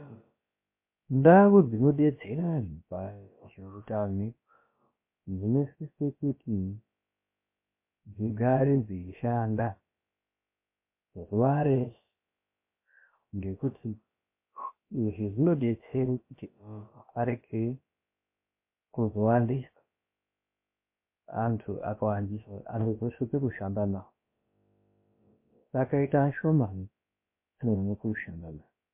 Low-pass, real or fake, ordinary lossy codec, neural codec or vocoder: 3.6 kHz; fake; MP3, 24 kbps; codec, 16 kHz, about 1 kbps, DyCAST, with the encoder's durations